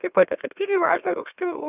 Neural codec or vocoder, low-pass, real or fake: autoencoder, 44.1 kHz, a latent of 192 numbers a frame, MeloTTS; 3.6 kHz; fake